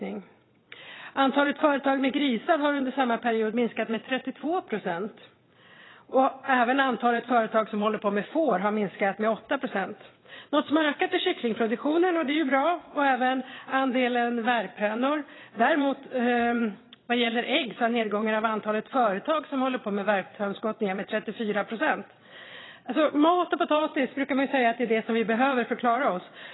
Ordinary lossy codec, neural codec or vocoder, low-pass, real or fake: AAC, 16 kbps; vocoder, 22.05 kHz, 80 mel bands, WaveNeXt; 7.2 kHz; fake